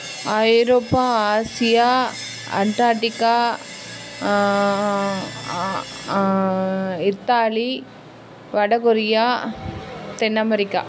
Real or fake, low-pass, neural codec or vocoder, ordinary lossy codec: real; none; none; none